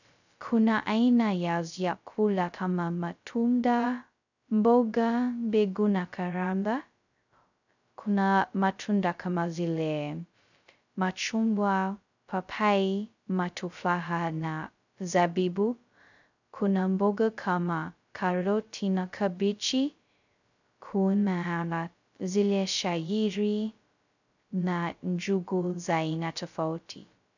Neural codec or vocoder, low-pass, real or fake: codec, 16 kHz, 0.2 kbps, FocalCodec; 7.2 kHz; fake